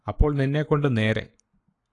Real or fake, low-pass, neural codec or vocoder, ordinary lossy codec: fake; 10.8 kHz; codec, 44.1 kHz, 7.8 kbps, Pupu-Codec; AAC, 48 kbps